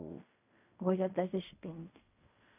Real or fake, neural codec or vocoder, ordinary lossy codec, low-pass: fake; codec, 16 kHz in and 24 kHz out, 0.4 kbps, LongCat-Audio-Codec, fine tuned four codebook decoder; none; 3.6 kHz